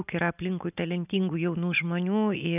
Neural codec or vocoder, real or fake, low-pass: none; real; 3.6 kHz